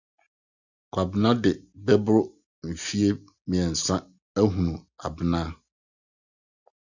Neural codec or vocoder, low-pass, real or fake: none; 7.2 kHz; real